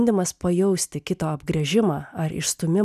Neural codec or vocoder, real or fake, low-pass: none; real; 14.4 kHz